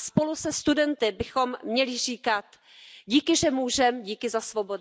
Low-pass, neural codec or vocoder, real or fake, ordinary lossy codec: none; none; real; none